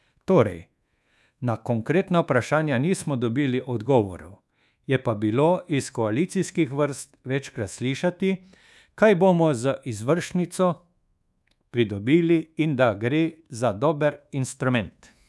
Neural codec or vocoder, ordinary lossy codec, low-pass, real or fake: codec, 24 kHz, 1.2 kbps, DualCodec; none; none; fake